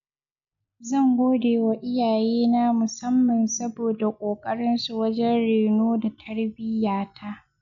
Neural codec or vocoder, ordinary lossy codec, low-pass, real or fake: none; none; 7.2 kHz; real